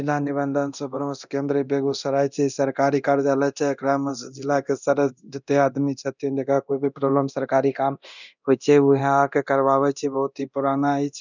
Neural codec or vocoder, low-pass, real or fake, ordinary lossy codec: codec, 24 kHz, 0.9 kbps, DualCodec; 7.2 kHz; fake; none